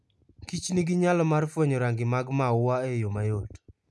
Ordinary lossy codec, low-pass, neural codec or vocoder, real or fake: none; none; none; real